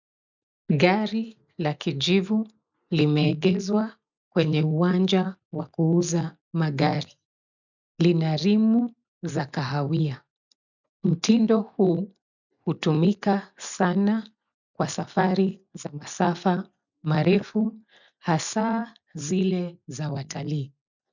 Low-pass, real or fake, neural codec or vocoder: 7.2 kHz; real; none